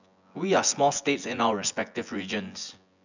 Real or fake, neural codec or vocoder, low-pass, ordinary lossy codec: fake; vocoder, 24 kHz, 100 mel bands, Vocos; 7.2 kHz; none